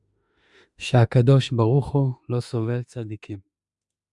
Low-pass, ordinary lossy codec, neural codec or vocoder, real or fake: 10.8 kHz; Opus, 64 kbps; autoencoder, 48 kHz, 32 numbers a frame, DAC-VAE, trained on Japanese speech; fake